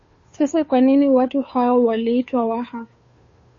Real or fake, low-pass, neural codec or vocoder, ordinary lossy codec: fake; 7.2 kHz; codec, 16 kHz, 2 kbps, FunCodec, trained on Chinese and English, 25 frames a second; MP3, 32 kbps